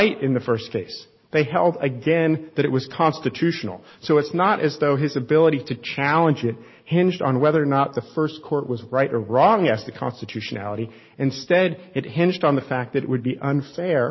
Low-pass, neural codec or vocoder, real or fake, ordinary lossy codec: 7.2 kHz; none; real; MP3, 24 kbps